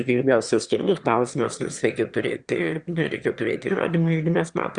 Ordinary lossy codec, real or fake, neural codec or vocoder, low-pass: Opus, 64 kbps; fake; autoencoder, 22.05 kHz, a latent of 192 numbers a frame, VITS, trained on one speaker; 9.9 kHz